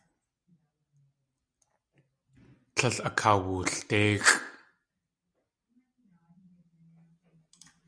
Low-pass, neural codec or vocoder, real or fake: 9.9 kHz; none; real